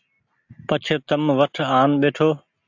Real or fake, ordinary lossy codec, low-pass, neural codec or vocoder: real; AAC, 48 kbps; 7.2 kHz; none